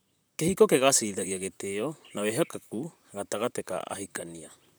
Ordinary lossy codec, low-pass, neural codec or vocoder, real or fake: none; none; vocoder, 44.1 kHz, 128 mel bands, Pupu-Vocoder; fake